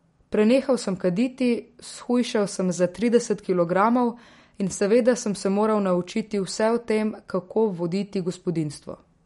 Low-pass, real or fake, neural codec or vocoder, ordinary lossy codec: 19.8 kHz; real; none; MP3, 48 kbps